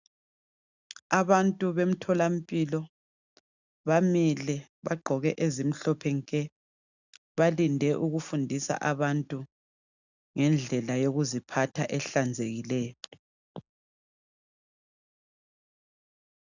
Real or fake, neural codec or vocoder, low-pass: real; none; 7.2 kHz